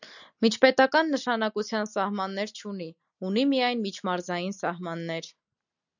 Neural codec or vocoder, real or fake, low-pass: none; real; 7.2 kHz